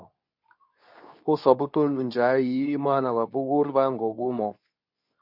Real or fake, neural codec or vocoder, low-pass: fake; codec, 24 kHz, 0.9 kbps, WavTokenizer, medium speech release version 2; 5.4 kHz